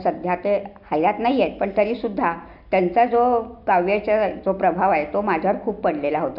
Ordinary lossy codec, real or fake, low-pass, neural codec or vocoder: none; real; 5.4 kHz; none